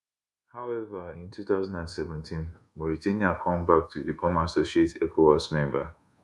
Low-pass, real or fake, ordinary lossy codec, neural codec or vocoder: none; fake; none; codec, 24 kHz, 1.2 kbps, DualCodec